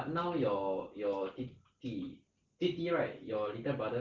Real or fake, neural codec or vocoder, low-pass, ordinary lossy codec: real; none; 7.2 kHz; Opus, 16 kbps